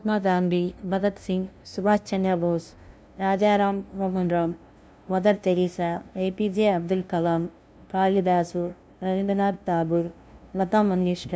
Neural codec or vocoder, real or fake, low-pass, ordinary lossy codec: codec, 16 kHz, 0.5 kbps, FunCodec, trained on LibriTTS, 25 frames a second; fake; none; none